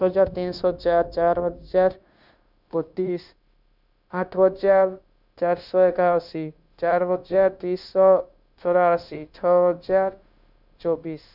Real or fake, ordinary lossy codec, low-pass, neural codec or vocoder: fake; none; 5.4 kHz; codec, 16 kHz, 0.9 kbps, LongCat-Audio-Codec